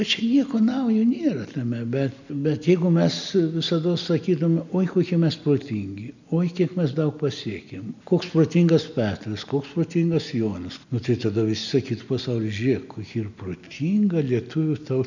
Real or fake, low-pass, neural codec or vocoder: real; 7.2 kHz; none